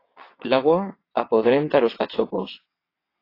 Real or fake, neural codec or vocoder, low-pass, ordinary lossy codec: fake; vocoder, 22.05 kHz, 80 mel bands, WaveNeXt; 5.4 kHz; AAC, 24 kbps